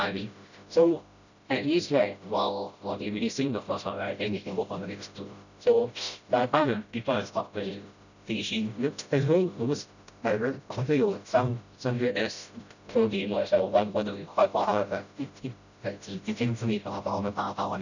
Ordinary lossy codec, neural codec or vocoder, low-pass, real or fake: none; codec, 16 kHz, 0.5 kbps, FreqCodec, smaller model; 7.2 kHz; fake